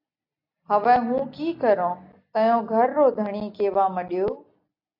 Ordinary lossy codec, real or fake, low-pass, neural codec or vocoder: AAC, 48 kbps; real; 5.4 kHz; none